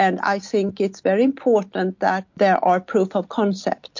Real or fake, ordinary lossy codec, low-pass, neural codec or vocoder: real; MP3, 48 kbps; 7.2 kHz; none